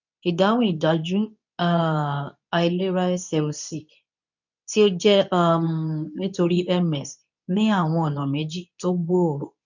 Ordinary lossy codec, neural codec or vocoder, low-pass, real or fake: none; codec, 24 kHz, 0.9 kbps, WavTokenizer, medium speech release version 2; 7.2 kHz; fake